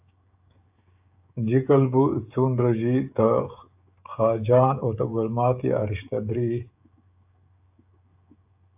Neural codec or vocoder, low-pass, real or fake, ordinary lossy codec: codec, 16 kHz, 16 kbps, FreqCodec, smaller model; 3.6 kHz; fake; AAC, 32 kbps